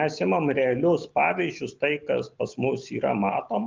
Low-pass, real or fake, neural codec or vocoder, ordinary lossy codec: 7.2 kHz; real; none; Opus, 32 kbps